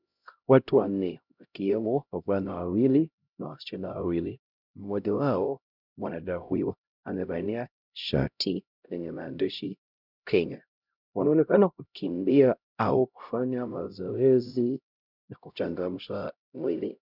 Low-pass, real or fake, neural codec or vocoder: 5.4 kHz; fake; codec, 16 kHz, 0.5 kbps, X-Codec, HuBERT features, trained on LibriSpeech